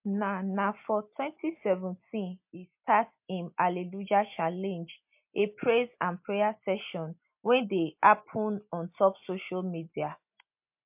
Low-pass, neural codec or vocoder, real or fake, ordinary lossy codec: 3.6 kHz; none; real; MP3, 32 kbps